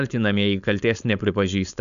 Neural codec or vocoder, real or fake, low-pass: codec, 16 kHz, 4.8 kbps, FACodec; fake; 7.2 kHz